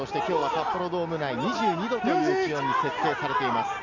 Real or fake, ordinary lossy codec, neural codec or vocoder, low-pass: real; none; none; 7.2 kHz